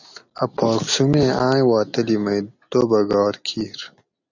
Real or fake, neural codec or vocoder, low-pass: real; none; 7.2 kHz